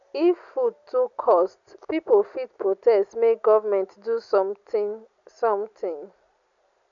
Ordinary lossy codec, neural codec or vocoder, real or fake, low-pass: none; none; real; 7.2 kHz